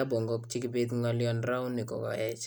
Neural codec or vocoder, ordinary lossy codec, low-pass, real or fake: none; none; none; real